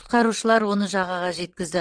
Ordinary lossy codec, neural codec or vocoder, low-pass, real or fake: Opus, 16 kbps; vocoder, 22.05 kHz, 80 mel bands, WaveNeXt; 9.9 kHz; fake